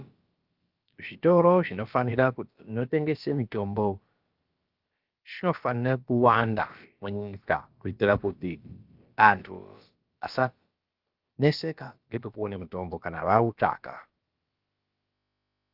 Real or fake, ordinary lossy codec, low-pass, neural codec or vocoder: fake; Opus, 32 kbps; 5.4 kHz; codec, 16 kHz, about 1 kbps, DyCAST, with the encoder's durations